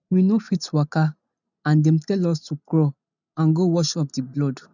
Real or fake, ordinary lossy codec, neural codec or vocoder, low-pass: real; none; none; 7.2 kHz